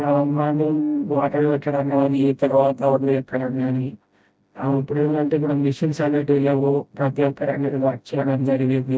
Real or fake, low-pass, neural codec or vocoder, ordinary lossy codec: fake; none; codec, 16 kHz, 0.5 kbps, FreqCodec, smaller model; none